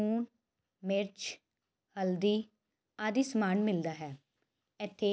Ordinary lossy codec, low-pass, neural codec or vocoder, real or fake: none; none; none; real